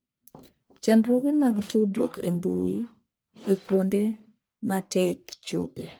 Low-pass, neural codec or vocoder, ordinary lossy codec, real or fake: none; codec, 44.1 kHz, 1.7 kbps, Pupu-Codec; none; fake